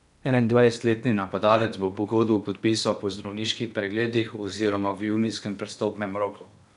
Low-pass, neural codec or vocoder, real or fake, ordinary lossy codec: 10.8 kHz; codec, 16 kHz in and 24 kHz out, 0.8 kbps, FocalCodec, streaming, 65536 codes; fake; none